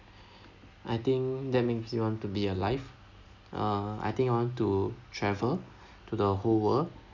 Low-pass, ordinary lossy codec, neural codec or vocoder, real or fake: 7.2 kHz; none; none; real